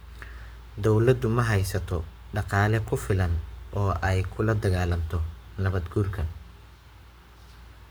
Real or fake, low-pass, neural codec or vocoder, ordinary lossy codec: fake; none; codec, 44.1 kHz, 7.8 kbps, Pupu-Codec; none